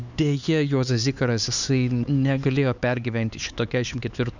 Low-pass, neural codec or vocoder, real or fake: 7.2 kHz; codec, 16 kHz, 4 kbps, X-Codec, HuBERT features, trained on LibriSpeech; fake